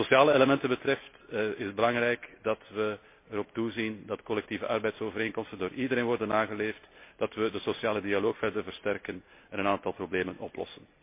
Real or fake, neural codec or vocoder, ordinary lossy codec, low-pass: real; none; MP3, 24 kbps; 3.6 kHz